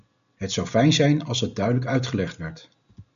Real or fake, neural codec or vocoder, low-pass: real; none; 7.2 kHz